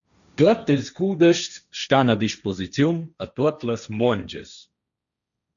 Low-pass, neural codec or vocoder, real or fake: 7.2 kHz; codec, 16 kHz, 1.1 kbps, Voila-Tokenizer; fake